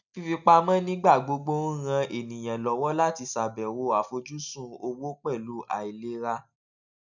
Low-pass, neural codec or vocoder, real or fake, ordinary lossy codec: 7.2 kHz; none; real; none